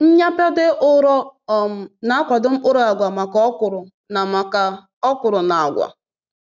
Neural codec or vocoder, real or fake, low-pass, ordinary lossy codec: none; real; 7.2 kHz; none